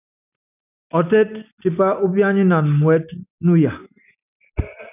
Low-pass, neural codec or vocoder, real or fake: 3.6 kHz; none; real